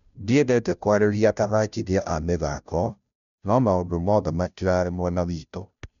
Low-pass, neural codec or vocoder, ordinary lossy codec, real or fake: 7.2 kHz; codec, 16 kHz, 0.5 kbps, FunCodec, trained on Chinese and English, 25 frames a second; none; fake